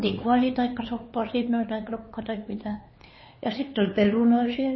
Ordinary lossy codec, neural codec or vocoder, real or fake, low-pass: MP3, 24 kbps; codec, 16 kHz, 4 kbps, X-Codec, HuBERT features, trained on LibriSpeech; fake; 7.2 kHz